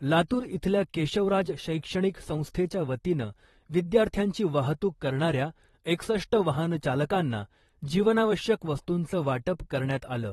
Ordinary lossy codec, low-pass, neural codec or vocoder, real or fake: AAC, 32 kbps; 19.8 kHz; vocoder, 44.1 kHz, 128 mel bands, Pupu-Vocoder; fake